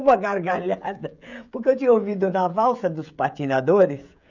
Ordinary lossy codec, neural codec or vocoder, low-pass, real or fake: none; codec, 16 kHz, 16 kbps, FreqCodec, smaller model; 7.2 kHz; fake